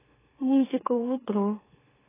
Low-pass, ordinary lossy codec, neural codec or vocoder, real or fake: 3.6 kHz; AAC, 16 kbps; autoencoder, 44.1 kHz, a latent of 192 numbers a frame, MeloTTS; fake